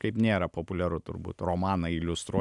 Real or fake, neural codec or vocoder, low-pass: real; none; 10.8 kHz